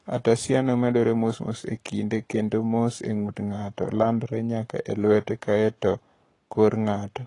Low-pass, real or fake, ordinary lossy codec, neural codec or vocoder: 10.8 kHz; fake; AAC, 32 kbps; codec, 44.1 kHz, 7.8 kbps, Pupu-Codec